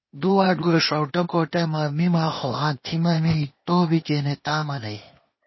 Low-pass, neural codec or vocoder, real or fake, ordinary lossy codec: 7.2 kHz; codec, 16 kHz, 0.8 kbps, ZipCodec; fake; MP3, 24 kbps